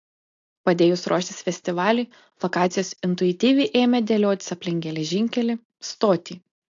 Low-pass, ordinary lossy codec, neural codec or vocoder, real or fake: 7.2 kHz; AAC, 48 kbps; none; real